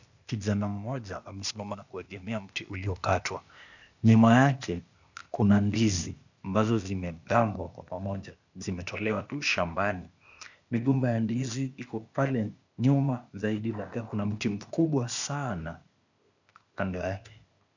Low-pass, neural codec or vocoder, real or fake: 7.2 kHz; codec, 16 kHz, 0.8 kbps, ZipCodec; fake